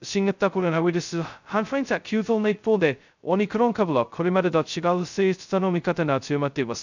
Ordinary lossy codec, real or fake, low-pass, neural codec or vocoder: none; fake; 7.2 kHz; codec, 16 kHz, 0.2 kbps, FocalCodec